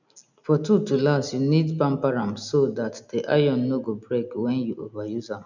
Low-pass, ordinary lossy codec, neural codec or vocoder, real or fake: 7.2 kHz; none; none; real